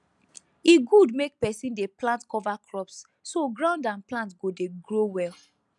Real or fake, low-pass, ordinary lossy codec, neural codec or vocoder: real; 10.8 kHz; none; none